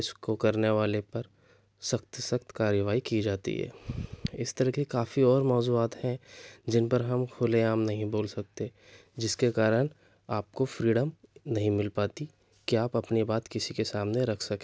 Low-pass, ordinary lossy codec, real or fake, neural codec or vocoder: none; none; real; none